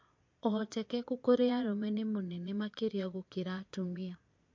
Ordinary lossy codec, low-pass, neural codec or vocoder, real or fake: MP3, 48 kbps; 7.2 kHz; vocoder, 22.05 kHz, 80 mel bands, Vocos; fake